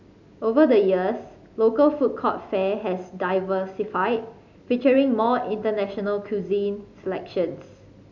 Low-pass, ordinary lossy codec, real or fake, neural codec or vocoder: 7.2 kHz; none; real; none